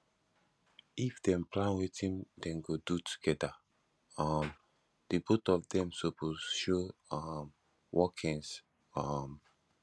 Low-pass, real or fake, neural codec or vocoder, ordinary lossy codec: none; real; none; none